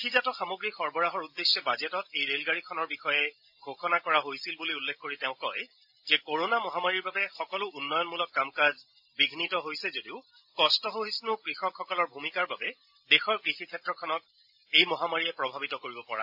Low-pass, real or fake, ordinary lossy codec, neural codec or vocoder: 5.4 kHz; real; AAC, 48 kbps; none